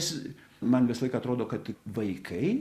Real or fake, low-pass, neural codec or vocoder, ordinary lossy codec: real; 14.4 kHz; none; Opus, 64 kbps